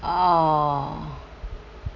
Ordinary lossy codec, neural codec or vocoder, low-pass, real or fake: none; none; 7.2 kHz; real